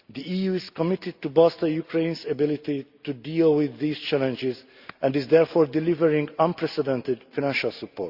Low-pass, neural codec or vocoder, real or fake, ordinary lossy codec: 5.4 kHz; none; real; Opus, 64 kbps